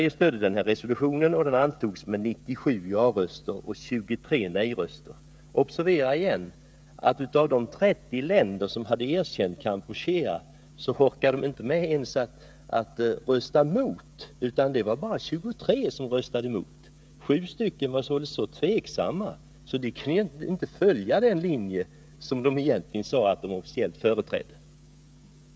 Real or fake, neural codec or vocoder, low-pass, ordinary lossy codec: fake; codec, 16 kHz, 16 kbps, FreqCodec, smaller model; none; none